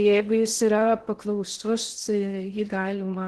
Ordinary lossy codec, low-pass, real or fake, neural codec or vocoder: Opus, 16 kbps; 10.8 kHz; fake; codec, 16 kHz in and 24 kHz out, 0.6 kbps, FocalCodec, streaming, 4096 codes